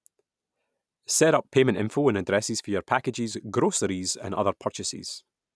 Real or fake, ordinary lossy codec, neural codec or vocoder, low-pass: real; none; none; none